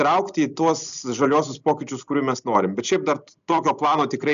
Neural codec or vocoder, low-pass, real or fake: none; 7.2 kHz; real